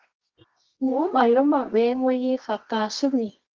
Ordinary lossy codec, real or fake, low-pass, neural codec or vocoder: Opus, 32 kbps; fake; 7.2 kHz; codec, 24 kHz, 0.9 kbps, WavTokenizer, medium music audio release